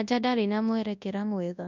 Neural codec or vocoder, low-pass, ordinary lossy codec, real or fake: codec, 24 kHz, 0.9 kbps, WavTokenizer, large speech release; 7.2 kHz; none; fake